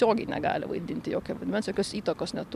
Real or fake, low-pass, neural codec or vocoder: fake; 14.4 kHz; vocoder, 44.1 kHz, 128 mel bands every 256 samples, BigVGAN v2